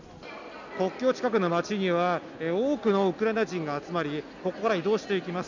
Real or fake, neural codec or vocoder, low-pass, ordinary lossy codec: real; none; 7.2 kHz; none